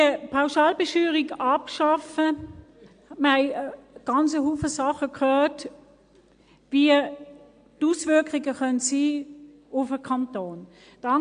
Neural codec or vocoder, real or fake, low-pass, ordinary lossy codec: none; real; 9.9 kHz; AAC, 64 kbps